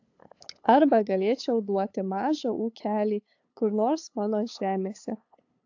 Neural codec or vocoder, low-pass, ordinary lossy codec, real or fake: codec, 16 kHz, 8 kbps, FunCodec, trained on LibriTTS, 25 frames a second; 7.2 kHz; AAC, 48 kbps; fake